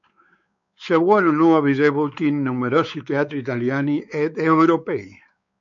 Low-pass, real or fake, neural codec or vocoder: 7.2 kHz; fake; codec, 16 kHz, 4 kbps, X-Codec, WavLM features, trained on Multilingual LibriSpeech